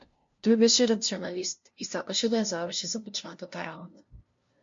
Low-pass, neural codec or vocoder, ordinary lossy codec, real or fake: 7.2 kHz; codec, 16 kHz, 0.5 kbps, FunCodec, trained on LibriTTS, 25 frames a second; MP3, 48 kbps; fake